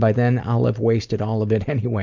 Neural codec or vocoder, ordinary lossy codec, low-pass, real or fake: none; MP3, 64 kbps; 7.2 kHz; real